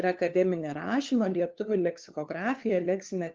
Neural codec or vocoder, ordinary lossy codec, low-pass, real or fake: codec, 16 kHz, 2 kbps, FunCodec, trained on LibriTTS, 25 frames a second; Opus, 24 kbps; 7.2 kHz; fake